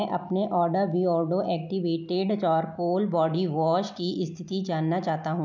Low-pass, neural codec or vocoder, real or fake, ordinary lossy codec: 7.2 kHz; none; real; none